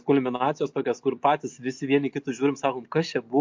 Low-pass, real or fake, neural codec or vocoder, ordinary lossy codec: 7.2 kHz; real; none; MP3, 48 kbps